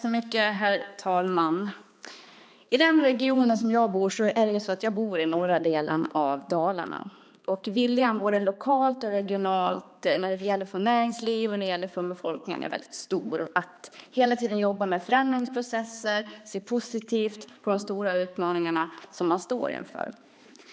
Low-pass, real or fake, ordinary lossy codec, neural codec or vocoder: none; fake; none; codec, 16 kHz, 2 kbps, X-Codec, HuBERT features, trained on balanced general audio